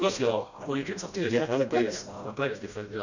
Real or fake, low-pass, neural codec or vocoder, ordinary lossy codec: fake; 7.2 kHz; codec, 16 kHz, 1 kbps, FreqCodec, smaller model; none